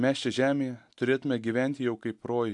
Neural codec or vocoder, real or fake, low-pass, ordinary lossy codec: none; real; 10.8 kHz; AAC, 64 kbps